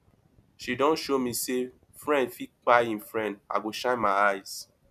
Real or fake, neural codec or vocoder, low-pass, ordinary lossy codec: real; none; 14.4 kHz; none